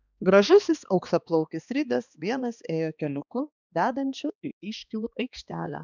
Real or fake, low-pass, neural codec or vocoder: fake; 7.2 kHz; codec, 16 kHz, 2 kbps, X-Codec, HuBERT features, trained on balanced general audio